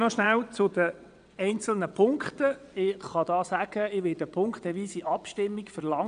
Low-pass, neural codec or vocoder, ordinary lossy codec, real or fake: 9.9 kHz; vocoder, 22.05 kHz, 80 mel bands, WaveNeXt; none; fake